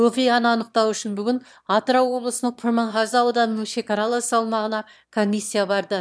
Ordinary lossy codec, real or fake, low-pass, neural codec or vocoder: none; fake; none; autoencoder, 22.05 kHz, a latent of 192 numbers a frame, VITS, trained on one speaker